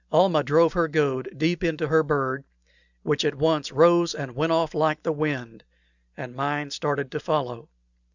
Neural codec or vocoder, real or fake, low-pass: none; real; 7.2 kHz